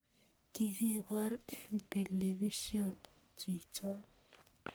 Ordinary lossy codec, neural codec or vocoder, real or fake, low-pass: none; codec, 44.1 kHz, 1.7 kbps, Pupu-Codec; fake; none